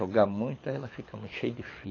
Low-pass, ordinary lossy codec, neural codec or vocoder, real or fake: 7.2 kHz; AAC, 32 kbps; codec, 24 kHz, 6 kbps, HILCodec; fake